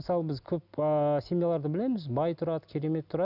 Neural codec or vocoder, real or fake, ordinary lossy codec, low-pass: none; real; none; 5.4 kHz